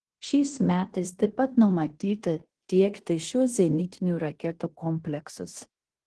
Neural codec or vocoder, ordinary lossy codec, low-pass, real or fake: codec, 16 kHz in and 24 kHz out, 0.9 kbps, LongCat-Audio-Codec, fine tuned four codebook decoder; Opus, 16 kbps; 10.8 kHz; fake